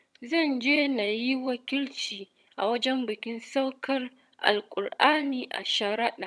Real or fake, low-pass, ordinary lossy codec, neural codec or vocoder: fake; none; none; vocoder, 22.05 kHz, 80 mel bands, HiFi-GAN